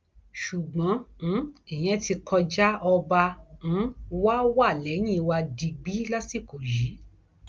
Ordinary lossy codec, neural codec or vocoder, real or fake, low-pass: Opus, 24 kbps; none; real; 7.2 kHz